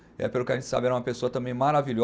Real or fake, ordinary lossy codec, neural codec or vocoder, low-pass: real; none; none; none